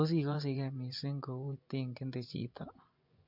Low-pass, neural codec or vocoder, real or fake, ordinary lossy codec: 5.4 kHz; vocoder, 24 kHz, 100 mel bands, Vocos; fake; MP3, 48 kbps